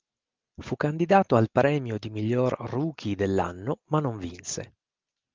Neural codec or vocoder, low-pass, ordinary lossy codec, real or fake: none; 7.2 kHz; Opus, 32 kbps; real